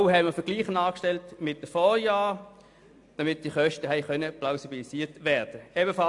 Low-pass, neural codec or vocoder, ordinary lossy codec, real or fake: 10.8 kHz; none; AAC, 64 kbps; real